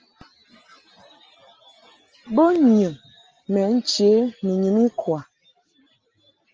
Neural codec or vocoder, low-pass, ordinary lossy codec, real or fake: none; 7.2 kHz; Opus, 24 kbps; real